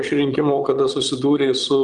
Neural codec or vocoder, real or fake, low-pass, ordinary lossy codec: vocoder, 22.05 kHz, 80 mel bands, Vocos; fake; 9.9 kHz; Opus, 32 kbps